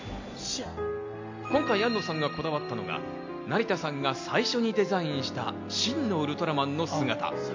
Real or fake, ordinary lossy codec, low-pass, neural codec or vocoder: real; MP3, 64 kbps; 7.2 kHz; none